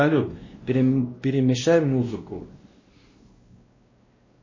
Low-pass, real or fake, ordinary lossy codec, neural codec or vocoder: 7.2 kHz; fake; MP3, 32 kbps; codec, 16 kHz, 1 kbps, X-Codec, WavLM features, trained on Multilingual LibriSpeech